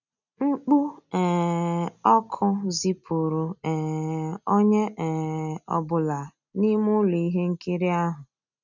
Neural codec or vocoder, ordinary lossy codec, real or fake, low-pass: none; none; real; 7.2 kHz